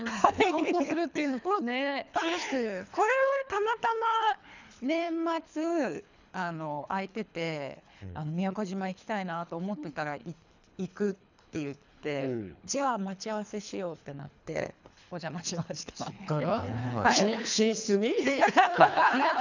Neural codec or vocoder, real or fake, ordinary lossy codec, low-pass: codec, 24 kHz, 3 kbps, HILCodec; fake; none; 7.2 kHz